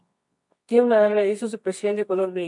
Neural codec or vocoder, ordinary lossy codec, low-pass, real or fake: codec, 24 kHz, 0.9 kbps, WavTokenizer, medium music audio release; MP3, 64 kbps; 10.8 kHz; fake